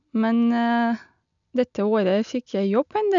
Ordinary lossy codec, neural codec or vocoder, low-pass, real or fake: none; none; 7.2 kHz; real